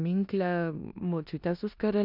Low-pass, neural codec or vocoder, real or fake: 5.4 kHz; codec, 16 kHz in and 24 kHz out, 0.9 kbps, LongCat-Audio-Codec, four codebook decoder; fake